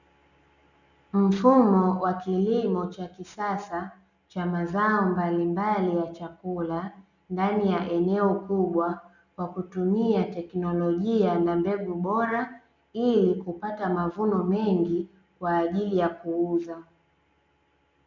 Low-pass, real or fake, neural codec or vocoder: 7.2 kHz; real; none